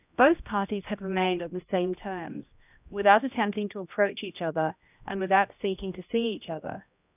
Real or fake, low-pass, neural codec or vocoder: fake; 3.6 kHz; codec, 16 kHz, 1 kbps, X-Codec, HuBERT features, trained on general audio